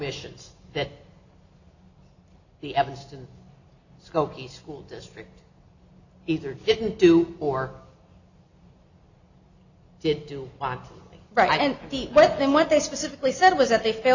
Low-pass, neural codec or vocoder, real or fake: 7.2 kHz; none; real